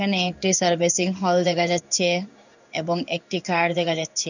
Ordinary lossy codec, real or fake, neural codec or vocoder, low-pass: none; fake; codec, 16 kHz in and 24 kHz out, 1 kbps, XY-Tokenizer; 7.2 kHz